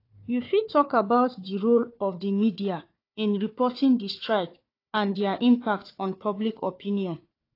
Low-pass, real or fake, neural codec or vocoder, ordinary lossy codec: 5.4 kHz; fake; codec, 16 kHz, 4 kbps, FunCodec, trained on Chinese and English, 50 frames a second; AAC, 32 kbps